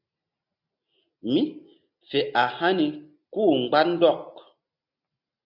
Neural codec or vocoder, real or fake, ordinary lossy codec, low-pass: none; real; MP3, 48 kbps; 5.4 kHz